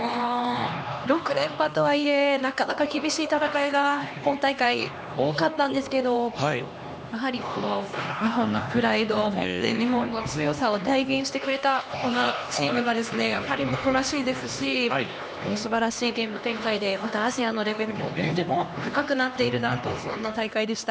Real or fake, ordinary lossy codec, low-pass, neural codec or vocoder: fake; none; none; codec, 16 kHz, 2 kbps, X-Codec, HuBERT features, trained on LibriSpeech